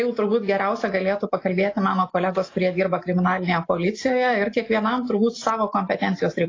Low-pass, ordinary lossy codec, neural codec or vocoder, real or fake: 7.2 kHz; AAC, 32 kbps; none; real